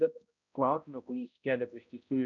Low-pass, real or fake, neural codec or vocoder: 7.2 kHz; fake; codec, 16 kHz, 0.5 kbps, X-Codec, HuBERT features, trained on balanced general audio